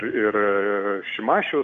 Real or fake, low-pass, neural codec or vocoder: real; 7.2 kHz; none